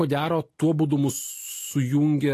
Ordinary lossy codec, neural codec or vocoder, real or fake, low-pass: AAC, 48 kbps; none; real; 14.4 kHz